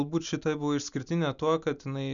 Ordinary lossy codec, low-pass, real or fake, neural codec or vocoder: MP3, 96 kbps; 7.2 kHz; real; none